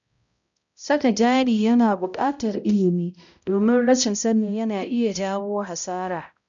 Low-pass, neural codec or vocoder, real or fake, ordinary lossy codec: 7.2 kHz; codec, 16 kHz, 0.5 kbps, X-Codec, HuBERT features, trained on balanced general audio; fake; none